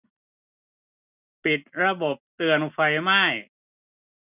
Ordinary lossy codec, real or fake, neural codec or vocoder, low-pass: none; real; none; 3.6 kHz